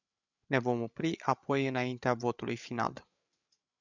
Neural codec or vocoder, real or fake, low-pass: codec, 16 kHz, 8 kbps, FreqCodec, larger model; fake; 7.2 kHz